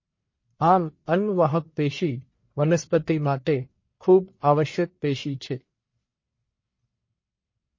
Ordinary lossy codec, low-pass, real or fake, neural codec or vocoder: MP3, 32 kbps; 7.2 kHz; fake; codec, 44.1 kHz, 1.7 kbps, Pupu-Codec